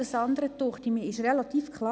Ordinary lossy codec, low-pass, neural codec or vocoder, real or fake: none; none; none; real